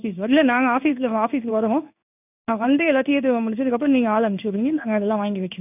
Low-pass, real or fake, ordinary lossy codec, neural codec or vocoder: 3.6 kHz; fake; none; codec, 16 kHz in and 24 kHz out, 1 kbps, XY-Tokenizer